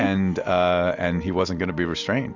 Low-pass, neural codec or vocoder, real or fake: 7.2 kHz; none; real